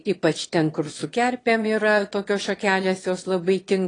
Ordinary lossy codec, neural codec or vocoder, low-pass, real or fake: AAC, 32 kbps; autoencoder, 22.05 kHz, a latent of 192 numbers a frame, VITS, trained on one speaker; 9.9 kHz; fake